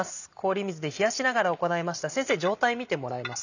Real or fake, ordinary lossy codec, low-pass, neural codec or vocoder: real; none; 7.2 kHz; none